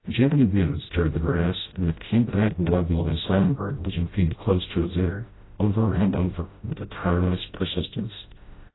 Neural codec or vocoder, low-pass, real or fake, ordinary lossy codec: codec, 16 kHz, 0.5 kbps, FreqCodec, smaller model; 7.2 kHz; fake; AAC, 16 kbps